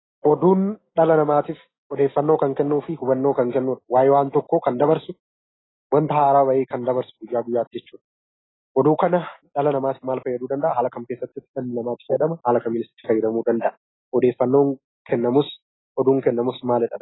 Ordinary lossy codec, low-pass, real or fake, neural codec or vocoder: AAC, 16 kbps; 7.2 kHz; real; none